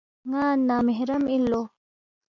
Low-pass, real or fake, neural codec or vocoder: 7.2 kHz; real; none